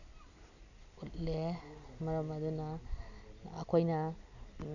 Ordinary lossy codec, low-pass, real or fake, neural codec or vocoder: none; 7.2 kHz; real; none